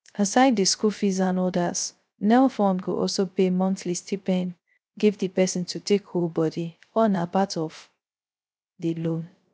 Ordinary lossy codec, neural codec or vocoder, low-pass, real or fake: none; codec, 16 kHz, 0.3 kbps, FocalCodec; none; fake